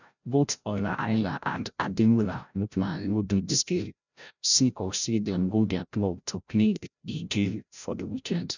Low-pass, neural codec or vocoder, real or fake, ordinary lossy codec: 7.2 kHz; codec, 16 kHz, 0.5 kbps, FreqCodec, larger model; fake; none